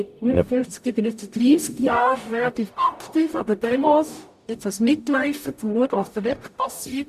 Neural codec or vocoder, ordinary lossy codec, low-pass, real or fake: codec, 44.1 kHz, 0.9 kbps, DAC; none; 14.4 kHz; fake